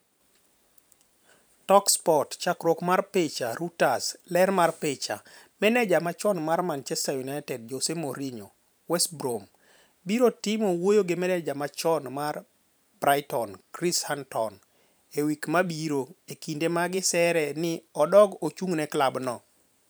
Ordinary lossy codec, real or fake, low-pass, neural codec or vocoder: none; fake; none; vocoder, 44.1 kHz, 128 mel bands every 512 samples, BigVGAN v2